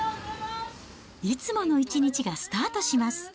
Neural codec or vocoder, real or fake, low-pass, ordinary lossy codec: none; real; none; none